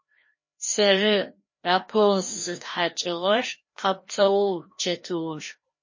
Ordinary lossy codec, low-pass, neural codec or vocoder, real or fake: MP3, 32 kbps; 7.2 kHz; codec, 16 kHz, 1 kbps, FreqCodec, larger model; fake